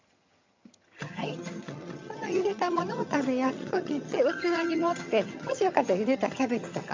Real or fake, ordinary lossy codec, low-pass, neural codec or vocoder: fake; MP3, 48 kbps; 7.2 kHz; vocoder, 22.05 kHz, 80 mel bands, HiFi-GAN